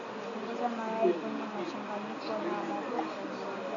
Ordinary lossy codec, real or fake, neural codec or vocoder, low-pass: MP3, 96 kbps; real; none; 7.2 kHz